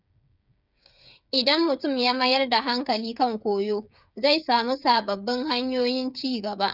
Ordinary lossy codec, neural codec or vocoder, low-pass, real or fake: none; codec, 16 kHz, 8 kbps, FreqCodec, smaller model; 5.4 kHz; fake